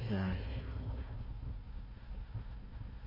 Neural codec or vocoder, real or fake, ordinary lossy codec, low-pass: codec, 16 kHz, 1 kbps, FunCodec, trained on Chinese and English, 50 frames a second; fake; MP3, 24 kbps; 5.4 kHz